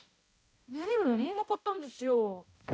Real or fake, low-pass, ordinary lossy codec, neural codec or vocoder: fake; none; none; codec, 16 kHz, 0.5 kbps, X-Codec, HuBERT features, trained on balanced general audio